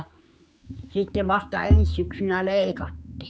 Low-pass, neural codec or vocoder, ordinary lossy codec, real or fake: none; codec, 16 kHz, 4 kbps, X-Codec, HuBERT features, trained on general audio; none; fake